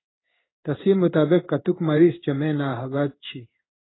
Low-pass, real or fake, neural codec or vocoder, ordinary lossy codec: 7.2 kHz; fake; codec, 16 kHz in and 24 kHz out, 1 kbps, XY-Tokenizer; AAC, 16 kbps